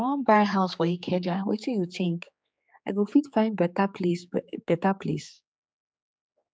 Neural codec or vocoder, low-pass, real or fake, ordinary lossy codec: codec, 16 kHz, 4 kbps, X-Codec, HuBERT features, trained on general audio; none; fake; none